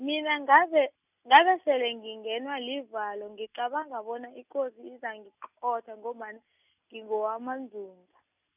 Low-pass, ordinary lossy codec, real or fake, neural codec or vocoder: 3.6 kHz; none; real; none